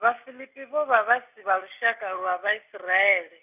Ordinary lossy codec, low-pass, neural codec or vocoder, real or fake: none; 3.6 kHz; none; real